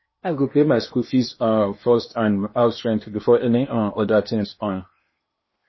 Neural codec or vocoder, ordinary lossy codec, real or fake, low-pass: codec, 16 kHz in and 24 kHz out, 0.8 kbps, FocalCodec, streaming, 65536 codes; MP3, 24 kbps; fake; 7.2 kHz